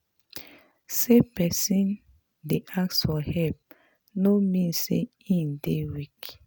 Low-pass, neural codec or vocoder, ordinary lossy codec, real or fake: none; none; none; real